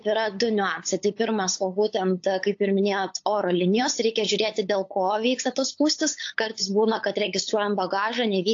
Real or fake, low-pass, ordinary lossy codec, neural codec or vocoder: fake; 7.2 kHz; AAC, 48 kbps; codec, 16 kHz, 8 kbps, FunCodec, trained on LibriTTS, 25 frames a second